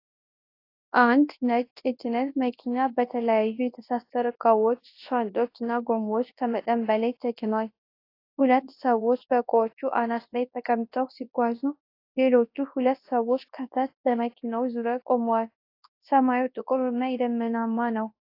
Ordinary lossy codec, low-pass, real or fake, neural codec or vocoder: AAC, 32 kbps; 5.4 kHz; fake; codec, 24 kHz, 0.9 kbps, WavTokenizer, large speech release